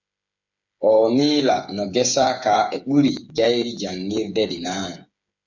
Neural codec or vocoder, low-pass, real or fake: codec, 16 kHz, 8 kbps, FreqCodec, smaller model; 7.2 kHz; fake